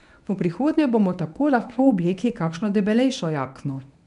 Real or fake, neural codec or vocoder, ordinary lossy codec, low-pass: fake; codec, 24 kHz, 0.9 kbps, WavTokenizer, medium speech release version 1; none; 10.8 kHz